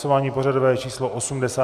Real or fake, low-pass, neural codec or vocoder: real; 14.4 kHz; none